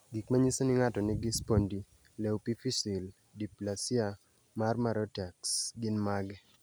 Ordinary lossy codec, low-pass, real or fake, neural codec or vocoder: none; none; real; none